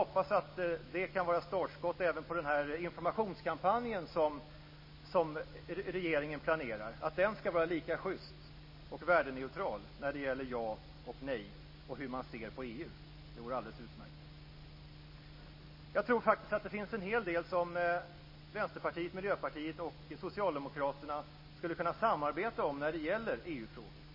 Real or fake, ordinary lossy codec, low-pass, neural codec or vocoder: real; MP3, 24 kbps; 5.4 kHz; none